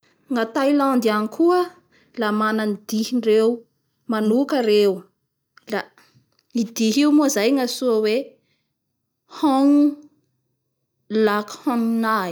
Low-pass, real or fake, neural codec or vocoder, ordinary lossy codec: none; real; none; none